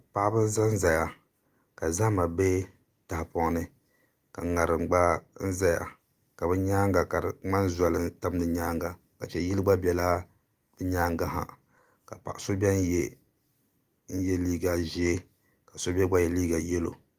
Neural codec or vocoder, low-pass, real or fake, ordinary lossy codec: none; 14.4 kHz; real; Opus, 32 kbps